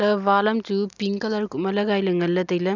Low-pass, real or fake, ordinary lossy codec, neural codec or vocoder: 7.2 kHz; real; none; none